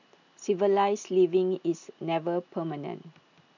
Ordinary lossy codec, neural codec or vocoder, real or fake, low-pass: none; none; real; 7.2 kHz